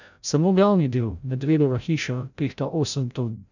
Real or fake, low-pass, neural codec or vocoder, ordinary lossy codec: fake; 7.2 kHz; codec, 16 kHz, 0.5 kbps, FreqCodec, larger model; none